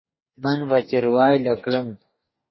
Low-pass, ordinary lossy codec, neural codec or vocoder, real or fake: 7.2 kHz; MP3, 24 kbps; codec, 44.1 kHz, 2.6 kbps, DAC; fake